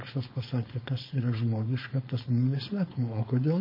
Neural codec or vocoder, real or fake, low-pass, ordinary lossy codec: codec, 16 kHz, 4.8 kbps, FACodec; fake; 5.4 kHz; MP3, 24 kbps